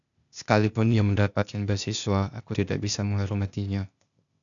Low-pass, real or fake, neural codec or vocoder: 7.2 kHz; fake; codec, 16 kHz, 0.8 kbps, ZipCodec